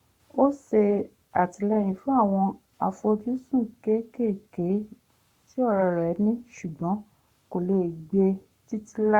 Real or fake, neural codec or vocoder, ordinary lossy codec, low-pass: fake; codec, 44.1 kHz, 7.8 kbps, Pupu-Codec; Opus, 64 kbps; 19.8 kHz